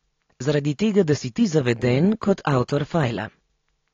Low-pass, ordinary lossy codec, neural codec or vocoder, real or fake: 7.2 kHz; AAC, 32 kbps; none; real